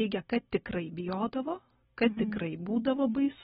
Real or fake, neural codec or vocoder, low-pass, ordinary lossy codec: real; none; 7.2 kHz; AAC, 16 kbps